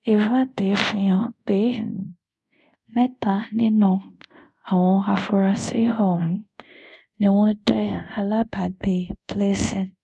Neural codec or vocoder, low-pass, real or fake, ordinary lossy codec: codec, 24 kHz, 0.5 kbps, DualCodec; none; fake; none